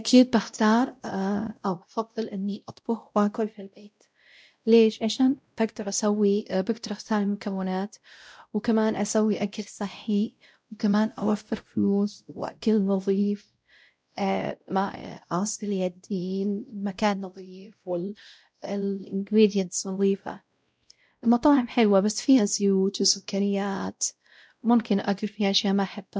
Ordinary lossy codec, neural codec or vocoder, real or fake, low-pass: none; codec, 16 kHz, 0.5 kbps, X-Codec, WavLM features, trained on Multilingual LibriSpeech; fake; none